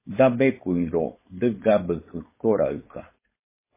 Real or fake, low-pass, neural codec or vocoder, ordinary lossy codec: fake; 3.6 kHz; codec, 16 kHz, 4.8 kbps, FACodec; MP3, 16 kbps